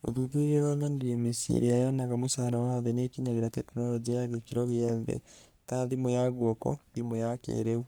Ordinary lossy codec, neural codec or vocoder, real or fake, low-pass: none; codec, 44.1 kHz, 3.4 kbps, Pupu-Codec; fake; none